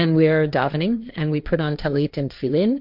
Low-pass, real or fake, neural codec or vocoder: 5.4 kHz; fake; codec, 16 kHz, 1.1 kbps, Voila-Tokenizer